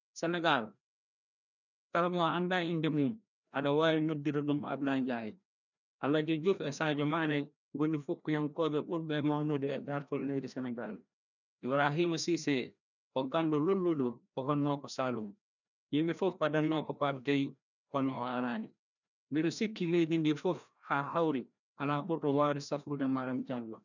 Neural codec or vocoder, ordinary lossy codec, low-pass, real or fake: codec, 16 kHz, 1 kbps, FreqCodec, larger model; none; 7.2 kHz; fake